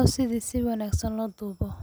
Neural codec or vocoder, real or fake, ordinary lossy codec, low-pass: none; real; none; none